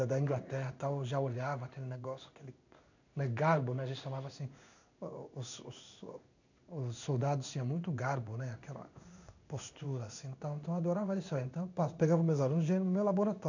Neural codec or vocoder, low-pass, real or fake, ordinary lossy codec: codec, 16 kHz in and 24 kHz out, 1 kbps, XY-Tokenizer; 7.2 kHz; fake; none